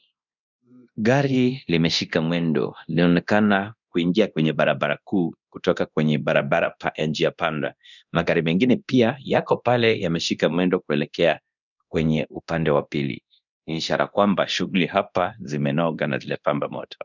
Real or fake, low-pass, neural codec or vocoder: fake; 7.2 kHz; codec, 24 kHz, 0.9 kbps, DualCodec